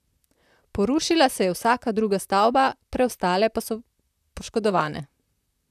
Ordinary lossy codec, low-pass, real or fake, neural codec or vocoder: none; 14.4 kHz; fake; vocoder, 44.1 kHz, 128 mel bands, Pupu-Vocoder